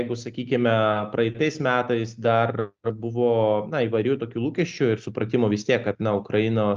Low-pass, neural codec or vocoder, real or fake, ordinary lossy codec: 7.2 kHz; none; real; Opus, 24 kbps